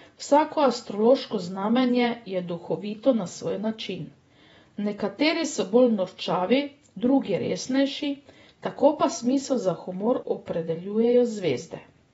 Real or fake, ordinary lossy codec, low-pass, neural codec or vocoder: fake; AAC, 24 kbps; 19.8 kHz; vocoder, 48 kHz, 128 mel bands, Vocos